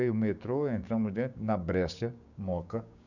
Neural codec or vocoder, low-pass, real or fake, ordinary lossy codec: codec, 16 kHz, 6 kbps, DAC; 7.2 kHz; fake; none